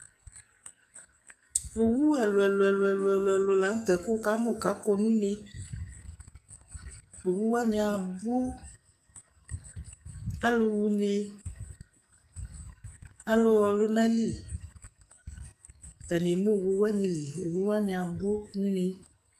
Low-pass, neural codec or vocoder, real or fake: 14.4 kHz; codec, 44.1 kHz, 2.6 kbps, SNAC; fake